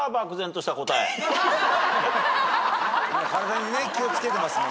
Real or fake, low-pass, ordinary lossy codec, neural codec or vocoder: real; none; none; none